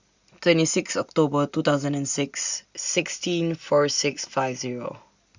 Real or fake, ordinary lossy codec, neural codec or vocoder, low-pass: real; Opus, 64 kbps; none; 7.2 kHz